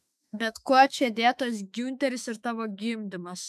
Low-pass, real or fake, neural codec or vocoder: 14.4 kHz; fake; autoencoder, 48 kHz, 32 numbers a frame, DAC-VAE, trained on Japanese speech